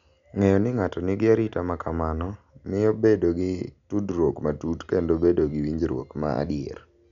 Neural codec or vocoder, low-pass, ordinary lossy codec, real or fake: none; 7.2 kHz; none; real